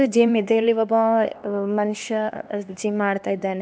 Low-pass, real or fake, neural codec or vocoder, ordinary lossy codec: none; fake; codec, 16 kHz, 2 kbps, X-Codec, HuBERT features, trained on LibriSpeech; none